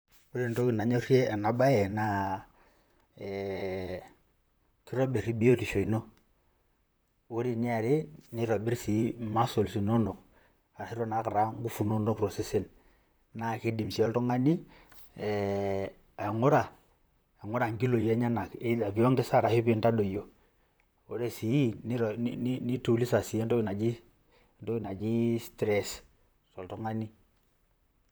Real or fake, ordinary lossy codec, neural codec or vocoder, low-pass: fake; none; vocoder, 44.1 kHz, 128 mel bands, Pupu-Vocoder; none